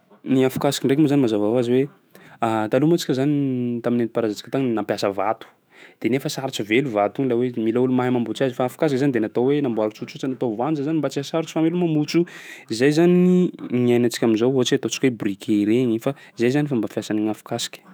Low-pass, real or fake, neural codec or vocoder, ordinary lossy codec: none; fake; autoencoder, 48 kHz, 128 numbers a frame, DAC-VAE, trained on Japanese speech; none